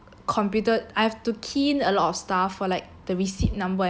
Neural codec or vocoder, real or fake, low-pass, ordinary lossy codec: none; real; none; none